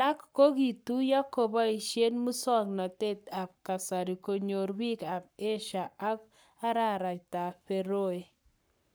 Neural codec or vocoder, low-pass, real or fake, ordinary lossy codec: codec, 44.1 kHz, 7.8 kbps, Pupu-Codec; none; fake; none